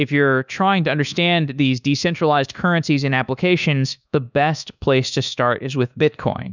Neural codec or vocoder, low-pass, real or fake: codec, 24 kHz, 1.2 kbps, DualCodec; 7.2 kHz; fake